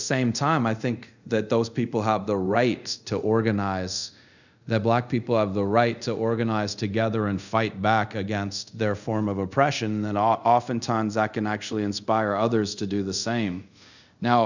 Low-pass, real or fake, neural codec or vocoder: 7.2 kHz; fake; codec, 24 kHz, 0.5 kbps, DualCodec